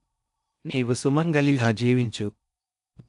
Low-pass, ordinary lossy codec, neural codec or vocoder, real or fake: 10.8 kHz; none; codec, 16 kHz in and 24 kHz out, 0.6 kbps, FocalCodec, streaming, 4096 codes; fake